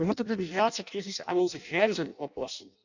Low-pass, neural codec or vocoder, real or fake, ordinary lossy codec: 7.2 kHz; codec, 16 kHz in and 24 kHz out, 0.6 kbps, FireRedTTS-2 codec; fake; none